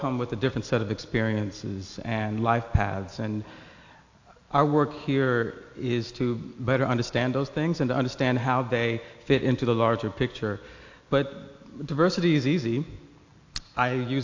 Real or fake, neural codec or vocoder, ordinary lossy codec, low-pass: real; none; AAC, 48 kbps; 7.2 kHz